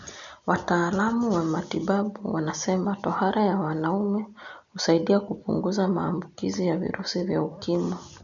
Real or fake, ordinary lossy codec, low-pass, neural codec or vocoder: real; none; 7.2 kHz; none